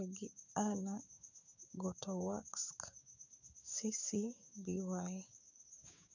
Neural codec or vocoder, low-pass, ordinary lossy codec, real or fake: codec, 16 kHz, 6 kbps, DAC; 7.2 kHz; none; fake